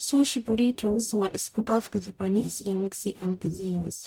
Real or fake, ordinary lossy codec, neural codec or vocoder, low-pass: fake; MP3, 96 kbps; codec, 44.1 kHz, 0.9 kbps, DAC; 19.8 kHz